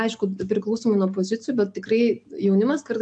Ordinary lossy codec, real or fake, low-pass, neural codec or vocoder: AAC, 64 kbps; real; 9.9 kHz; none